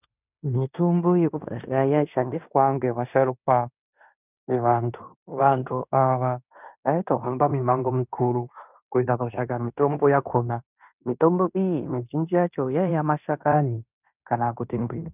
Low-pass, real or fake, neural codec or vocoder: 3.6 kHz; fake; codec, 16 kHz in and 24 kHz out, 0.9 kbps, LongCat-Audio-Codec, fine tuned four codebook decoder